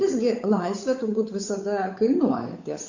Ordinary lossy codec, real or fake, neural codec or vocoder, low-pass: AAC, 48 kbps; fake; codec, 16 kHz, 8 kbps, FunCodec, trained on Chinese and English, 25 frames a second; 7.2 kHz